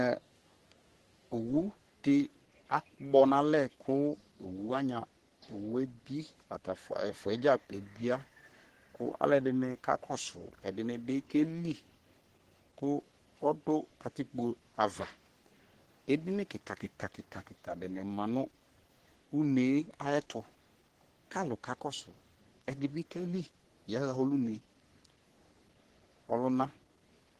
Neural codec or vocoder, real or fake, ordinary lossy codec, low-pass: codec, 44.1 kHz, 3.4 kbps, Pupu-Codec; fake; Opus, 16 kbps; 14.4 kHz